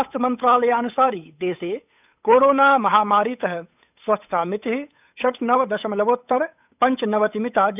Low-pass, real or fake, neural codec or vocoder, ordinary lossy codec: 3.6 kHz; fake; codec, 16 kHz, 8 kbps, FunCodec, trained on Chinese and English, 25 frames a second; none